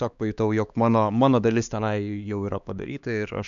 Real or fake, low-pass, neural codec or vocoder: fake; 7.2 kHz; codec, 16 kHz, 2 kbps, X-Codec, HuBERT features, trained on LibriSpeech